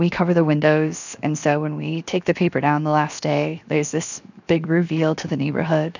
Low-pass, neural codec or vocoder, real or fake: 7.2 kHz; codec, 16 kHz, 0.7 kbps, FocalCodec; fake